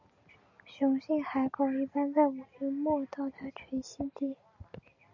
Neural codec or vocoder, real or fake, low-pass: none; real; 7.2 kHz